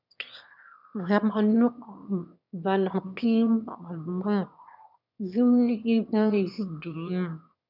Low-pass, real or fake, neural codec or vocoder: 5.4 kHz; fake; autoencoder, 22.05 kHz, a latent of 192 numbers a frame, VITS, trained on one speaker